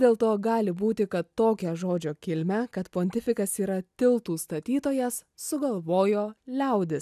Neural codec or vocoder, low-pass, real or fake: none; 14.4 kHz; real